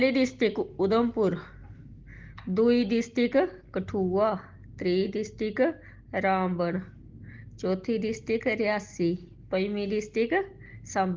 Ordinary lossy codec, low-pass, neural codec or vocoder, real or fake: Opus, 16 kbps; 7.2 kHz; none; real